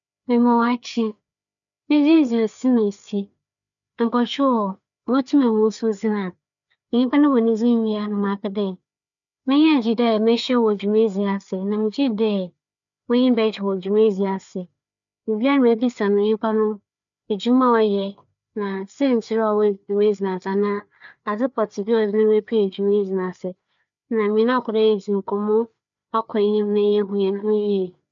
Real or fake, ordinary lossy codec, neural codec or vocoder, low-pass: fake; MP3, 64 kbps; codec, 16 kHz, 4 kbps, FreqCodec, larger model; 7.2 kHz